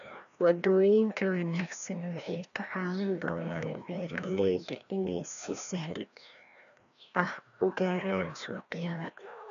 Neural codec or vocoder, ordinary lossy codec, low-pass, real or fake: codec, 16 kHz, 1 kbps, FreqCodec, larger model; none; 7.2 kHz; fake